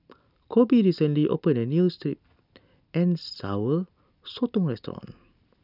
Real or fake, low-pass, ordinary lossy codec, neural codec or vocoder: real; 5.4 kHz; none; none